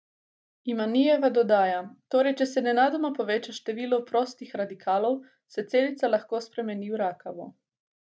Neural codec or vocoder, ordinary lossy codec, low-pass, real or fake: none; none; none; real